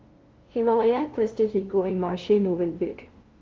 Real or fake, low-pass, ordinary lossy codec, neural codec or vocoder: fake; 7.2 kHz; Opus, 16 kbps; codec, 16 kHz, 0.5 kbps, FunCodec, trained on LibriTTS, 25 frames a second